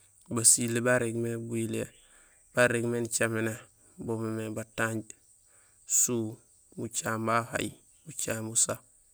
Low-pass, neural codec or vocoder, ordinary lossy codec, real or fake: none; none; none; real